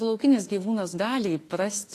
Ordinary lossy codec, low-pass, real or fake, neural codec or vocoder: AAC, 48 kbps; 14.4 kHz; fake; autoencoder, 48 kHz, 32 numbers a frame, DAC-VAE, trained on Japanese speech